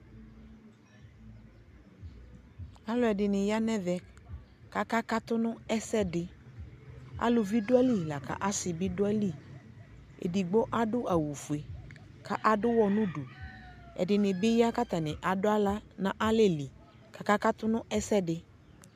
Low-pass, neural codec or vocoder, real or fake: 14.4 kHz; none; real